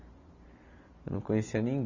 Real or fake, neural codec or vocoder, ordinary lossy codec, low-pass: real; none; none; 7.2 kHz